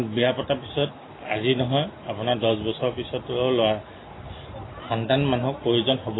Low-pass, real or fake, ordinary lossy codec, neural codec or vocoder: 7.2 kHz; real; AAC, 16 kbps; none